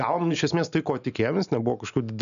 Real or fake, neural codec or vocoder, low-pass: real; none; 7.2 kHz